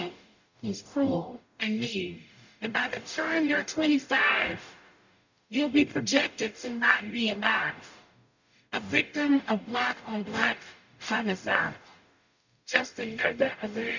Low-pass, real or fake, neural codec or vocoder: 7.2 kHz; fake; codec, 44.1 kHz, 0.9 kbps, DAC